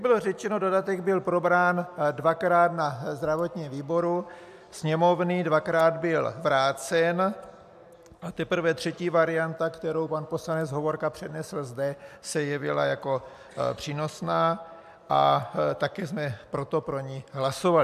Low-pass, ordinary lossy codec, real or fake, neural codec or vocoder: 14.4 kHz; AAC, 96 kbps; real; none